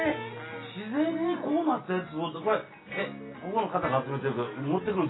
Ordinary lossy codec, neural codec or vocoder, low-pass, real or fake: AAC, 16 kbps; none; 7.2 kHz; real